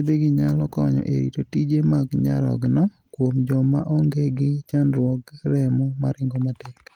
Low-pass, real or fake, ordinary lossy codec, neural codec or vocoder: 19.8 kHz; real; Opus, 24 kbps; none